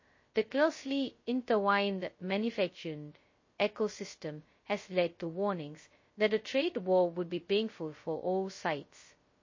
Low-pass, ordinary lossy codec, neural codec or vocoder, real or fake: 7.2 kHz; MP3, 32 kbps; codec, 16 kHz, 0.2 kbps, FocalCodec; fake